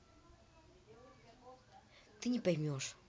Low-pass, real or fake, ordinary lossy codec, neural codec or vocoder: none; real; none; none